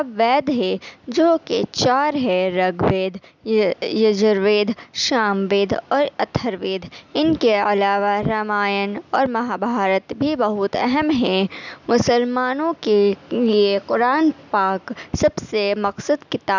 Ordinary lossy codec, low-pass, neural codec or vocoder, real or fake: none; 7.2 kHz; none; real